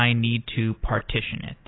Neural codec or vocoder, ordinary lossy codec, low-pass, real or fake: none; AAC, 16 kbps; 7.2 kHz; real